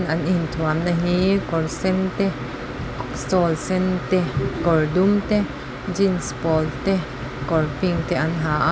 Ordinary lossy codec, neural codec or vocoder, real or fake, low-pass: none; none; real; none